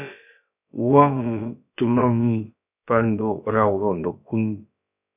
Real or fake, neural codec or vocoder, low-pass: fake; codec, 16 kHz, about 1 kbps, DyCAST, with the encoder's durations; 3.6 kHz